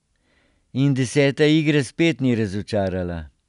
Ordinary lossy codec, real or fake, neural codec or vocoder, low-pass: none; real; none; 10.8 kHz